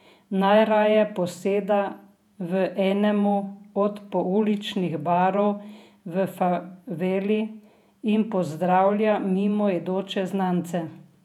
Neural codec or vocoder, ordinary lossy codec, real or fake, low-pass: vocoder, 48 kHz, 128 mel bands, Vocos; none; fake; 19.8 kHz